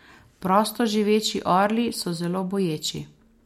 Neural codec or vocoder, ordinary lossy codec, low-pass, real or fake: none; MP3, 64 kbps; 19.8 kHz; real